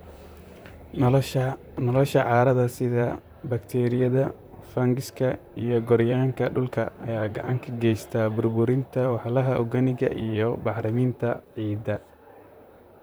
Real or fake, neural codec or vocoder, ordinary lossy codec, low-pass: fake; vocoder, 44.1 kHz, 128 mel bands, Pupu-Vocoder; none; none